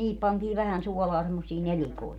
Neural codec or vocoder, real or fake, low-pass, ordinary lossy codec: none; real; 19.8 kHz; none